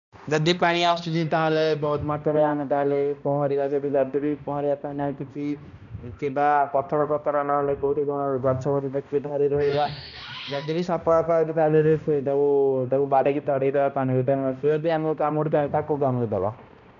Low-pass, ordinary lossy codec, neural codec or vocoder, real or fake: 7.2 kHz; none; codec, 16 kHz, 1 kbps, X-Codec, HuBERT features, trained on balanced general audio; fake